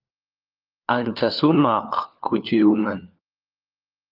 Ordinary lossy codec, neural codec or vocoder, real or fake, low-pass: Opus, 24 kbps; codec, 16 kHz, 4 kbps, FunCodec, trained on LibriTTS, 50 frames a second; fake; 5.4 kHz